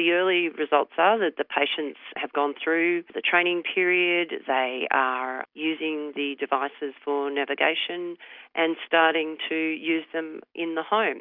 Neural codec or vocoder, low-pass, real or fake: none; 5.4 kHz; real